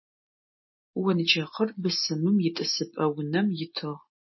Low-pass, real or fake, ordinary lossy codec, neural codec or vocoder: 7.2 kHz; real; MP3, 24 kbps; none